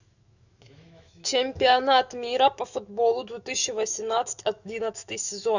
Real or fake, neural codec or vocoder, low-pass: fake; autoencoder, 48 kHz, 128 numbers a frame, DAC-VAE, trained on Japanese speech; 7.2 kHz